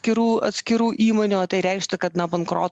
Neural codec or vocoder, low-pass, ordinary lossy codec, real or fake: none; 10.8 kHz; Opus, 64 kbps; real